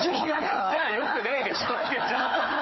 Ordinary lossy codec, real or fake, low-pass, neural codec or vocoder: MP3, 24 kbps; fake; 7.2 kHz; codec, 16 kHz, 4 kbps, FunCodec, trained on Chinese and English, 50 frames a second